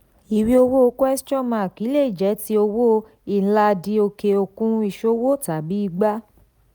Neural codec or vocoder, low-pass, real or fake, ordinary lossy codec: none; none; real; none